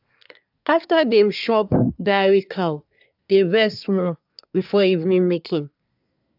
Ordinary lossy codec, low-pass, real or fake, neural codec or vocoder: AAC, 48 kbps; 5.4 kHz; fake; codec, 24 kHz, 1 kbps, SNAC